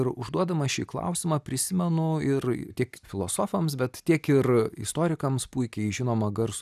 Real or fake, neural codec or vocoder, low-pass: real; none; 14.4 kHz